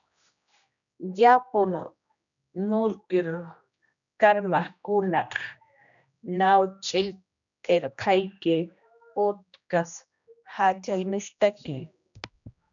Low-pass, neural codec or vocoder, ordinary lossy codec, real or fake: 7.2 kHz; codec, 16 kHz, 1 kbps, X-Codec, HuBERT features, trained on general audio; MP3, 96 kbps; fake